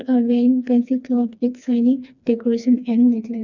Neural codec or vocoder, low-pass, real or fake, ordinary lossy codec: codec, 16 kHz, 2 kbps, FreqCodec, smaller model; 7.2 kHz; fake; none